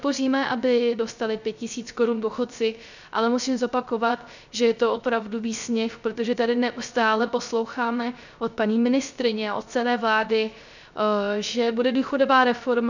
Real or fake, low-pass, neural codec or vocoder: fake; 7.2 kHz; codec, 16 kHz, 0.3 kbps, FocalCodec